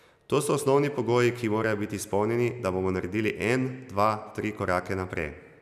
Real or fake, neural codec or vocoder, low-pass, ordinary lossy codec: real; none; 14.4 kHz; none